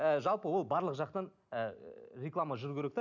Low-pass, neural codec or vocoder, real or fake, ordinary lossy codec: 7.2 kHz; none; real; none